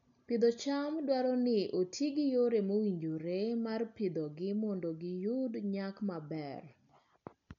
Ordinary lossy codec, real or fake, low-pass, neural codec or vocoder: none; real; 7.2 kHz; none